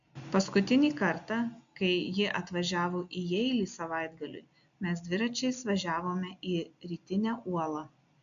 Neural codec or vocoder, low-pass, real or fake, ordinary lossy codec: none; 7.2 kHz; real; AAC, 64 kbps